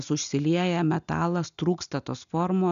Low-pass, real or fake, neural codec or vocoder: 7.2 kHz; real; none